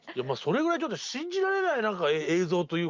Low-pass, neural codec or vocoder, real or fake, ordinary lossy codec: 7.2 kHz; none; real; Opus, 24 kbps